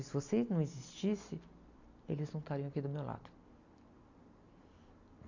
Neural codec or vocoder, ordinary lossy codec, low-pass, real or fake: none; none; 7.2 kHz; real